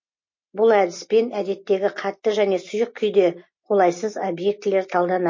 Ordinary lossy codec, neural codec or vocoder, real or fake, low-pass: MP3, 32 kbps; none; real; 7.2 kHz